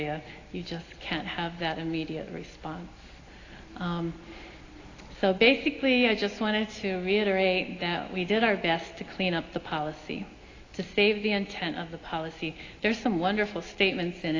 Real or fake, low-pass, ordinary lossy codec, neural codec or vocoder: real; 7.2 kHz; AAC, 32 kbps; none